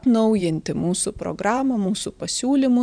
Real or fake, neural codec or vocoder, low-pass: fake; vocoder, 44.1 kHz, 128 mel bands every 512 samples, BigVGAN v2; 9.9 kHz